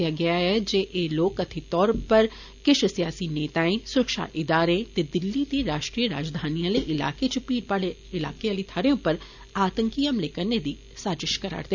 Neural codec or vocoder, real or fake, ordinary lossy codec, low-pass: none; real; none; 7.2 kHz